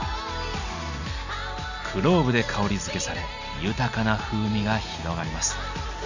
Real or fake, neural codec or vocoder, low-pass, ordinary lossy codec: real; none; 7.2 kHz; none